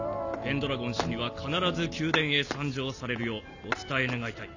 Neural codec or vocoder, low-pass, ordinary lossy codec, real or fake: none; 7.2 kHz; none; real